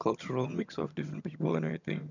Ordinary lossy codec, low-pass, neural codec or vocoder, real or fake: none; 7.2 kHz; vocoder, 22.05 kHz, 80 mel bands, HiFi-GAN; fake